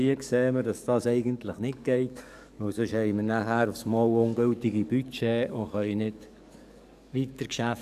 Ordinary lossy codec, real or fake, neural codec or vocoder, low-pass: none; fake; codec, 44.1 kHz, 7.8 kbps, DAC; 14.4 kHz